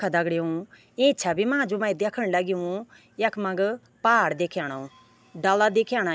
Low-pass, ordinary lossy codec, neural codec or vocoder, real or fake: none; none; none; real